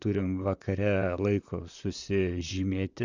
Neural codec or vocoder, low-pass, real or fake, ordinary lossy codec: vocoder, 44.1 kHz, 128 mel bands, Pupu-Vocoder; 7.2 kHz; fake; Opus, 64 kbps